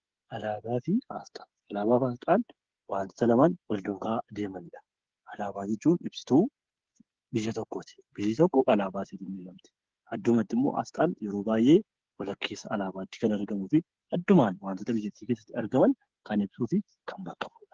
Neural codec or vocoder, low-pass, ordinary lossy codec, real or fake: codec, 16 kHz, 8 kbps, FreqCodec, smaller model; 7.2 kHz; Opus, 16 kbps; fake